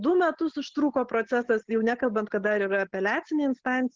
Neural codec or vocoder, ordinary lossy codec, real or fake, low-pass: none; Opus, 16 kbps; real; 7.2 kHz